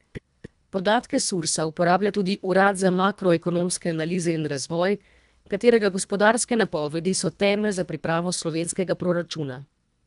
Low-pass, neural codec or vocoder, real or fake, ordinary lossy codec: 10.8 kHz; codec, 24 kHz, 1.5 kbps, HILCodec; fake; none